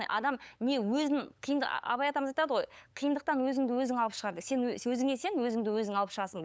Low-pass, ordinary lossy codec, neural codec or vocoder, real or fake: none; none; codec, 16 kHz, 8 kbps, FunCodec, trained on LibriTTS, 25 frames a second; fake